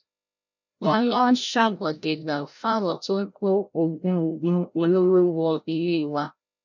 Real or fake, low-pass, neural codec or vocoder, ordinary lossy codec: fake; 7.2 kHz; codec, 16 kHz, 0.5 kbps, FreqCodec, larger model; none